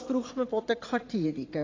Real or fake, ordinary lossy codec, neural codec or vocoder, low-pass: fake; AAC, 32 kbps; vocoder, 44.1 kHz, 80 mel bands, Vocos; 7.2 kHz